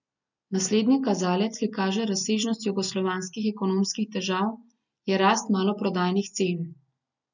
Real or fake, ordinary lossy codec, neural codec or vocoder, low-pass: real; none; none; 7.2 kHz